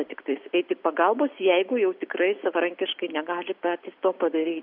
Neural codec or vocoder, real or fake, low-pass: none; real; 5.4 kHz